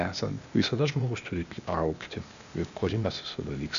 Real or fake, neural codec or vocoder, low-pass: fake; codec, 16 kHz, 0.8 kbps, ZipCodec; 7.2 kHz